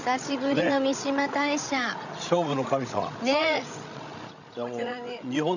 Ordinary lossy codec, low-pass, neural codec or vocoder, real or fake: none; 7.2 kHz; codec, 16 kHz, 16 kbps, FreqCodec, larger model; fake